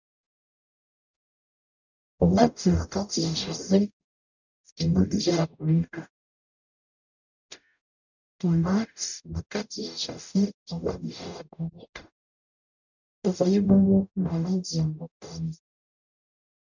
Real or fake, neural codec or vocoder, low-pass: fake; codec, 44.1 kHz, 0.9 kbps, DAC; 7.2 kHz